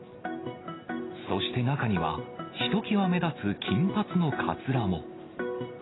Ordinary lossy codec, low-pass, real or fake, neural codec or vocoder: AAC, 16 kbps; 7.2 kHz; real; none